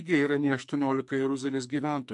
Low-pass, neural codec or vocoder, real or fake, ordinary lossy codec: 10.8 kHz; codec, 44.1 kHz, 2.6 kbps, SNAC; fake; MP3, 64 kbps